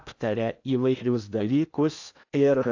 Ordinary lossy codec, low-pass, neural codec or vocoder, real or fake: AAC, 48 kbps; 7.2 kHz; codec, 16 kHz in and 24 kHz out, 0.8 kbps, FocalCodec, streaming, 65536 codes; fake